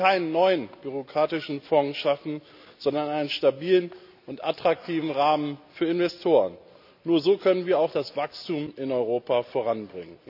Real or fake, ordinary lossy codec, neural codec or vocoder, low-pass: real; none; none; 5.4 kHz